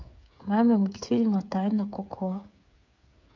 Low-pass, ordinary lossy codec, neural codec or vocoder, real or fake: 7.2 kHz; MP3, 48 kbps; codec, 16 kHz, 8 kbps, FreqCodec, smaller model; fake